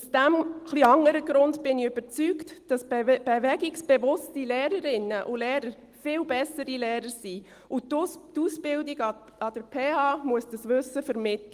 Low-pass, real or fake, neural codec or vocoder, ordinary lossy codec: 14.4 kHz; real; none; Opus, 24 kbps